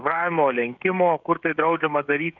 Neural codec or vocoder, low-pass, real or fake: codec, 16 kHz, 16 kbps, FreqCodec, smaller model; 7.2 kHz; fake